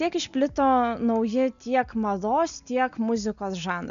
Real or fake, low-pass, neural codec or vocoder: real; 7.2 kHz; none